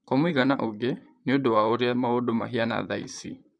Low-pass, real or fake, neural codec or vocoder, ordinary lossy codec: 9.9 kHz; fake; vocoder, 44.1 kHz, 128 mel bands, Pupu-Vocoder; none